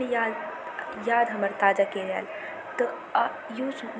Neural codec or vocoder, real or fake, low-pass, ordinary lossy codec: none; real; none; none